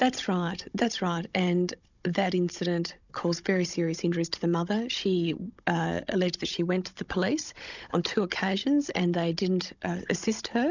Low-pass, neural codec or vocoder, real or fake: 7.2 kHz; codec, 16 kHz, 16 kbps, FunCodec, trained on Chinese and English, 50 frames a second; fake